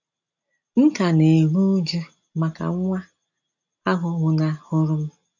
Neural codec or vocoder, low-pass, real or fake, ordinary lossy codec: none; 7.2 kHz; real; none